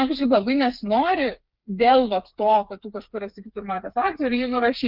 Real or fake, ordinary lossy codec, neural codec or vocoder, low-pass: fake; Opus, 32 kbps; codec, 16 kHz, 4 kbps, FreqCodec, smaller model; 5.4 kHz